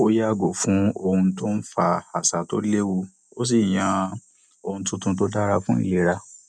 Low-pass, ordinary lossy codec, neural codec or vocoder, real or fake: none; none; none; real